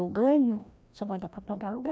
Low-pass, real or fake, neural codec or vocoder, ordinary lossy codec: none; fake; codec, 16 kHz, 1 kbps, FreqCodec, larger model; none